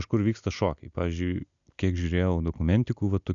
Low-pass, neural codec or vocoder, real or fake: 7.2 kHz; none; real